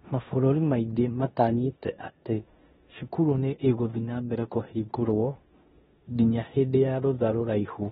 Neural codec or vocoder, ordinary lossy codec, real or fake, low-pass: codec, 16 kHz in and 24 kHz out, 0.9 kbps, LongCat-Audio-Codec, four codebook decoder; AAC, 16 kbps; fake; 10.8 kHz